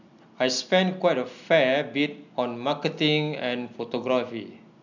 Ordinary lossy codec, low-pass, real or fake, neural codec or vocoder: none; 7.2 kHz; real; none